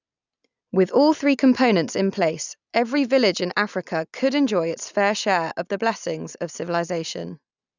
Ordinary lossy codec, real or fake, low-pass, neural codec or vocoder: none; real; 7.2 kHz; none